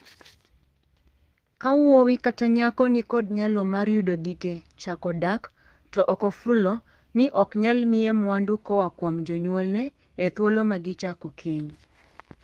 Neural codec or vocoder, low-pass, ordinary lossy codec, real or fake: codec, 32 kHz, 1.9 kbps, SNAC; 14.4 kHz; Opus, 24 kbps; fake